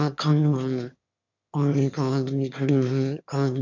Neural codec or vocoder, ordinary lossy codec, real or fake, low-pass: autoencoder, 22.05 kHz, a latent of 192 numbers a frame, VITS, trained on one speaker; none; fake; 7.2 kHz